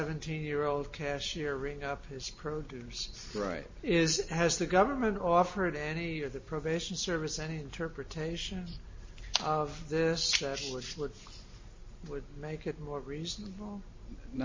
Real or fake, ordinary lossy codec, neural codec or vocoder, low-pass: real; MP3, 32 kbps; none; 7.2 kHz